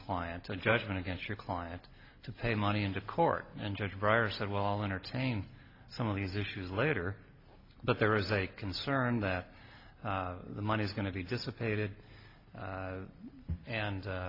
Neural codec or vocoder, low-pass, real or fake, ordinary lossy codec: none; 5.4 kHz; real; AAC, 32 kbps